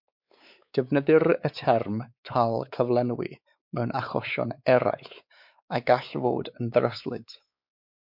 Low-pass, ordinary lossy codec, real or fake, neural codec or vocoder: 5.4 kHz; MP3, 48 kbps; fake; codec, 16 kHz, 4 kbps, X-Codec, WavLM features, trained on Multilingual LibriSpeech